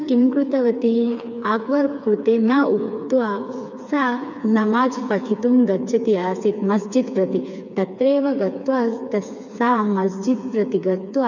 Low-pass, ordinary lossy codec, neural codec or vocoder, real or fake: 7.2 kHz; none; codec, 16 kHz, 4 kbps, FreqCodec, smaller model; fake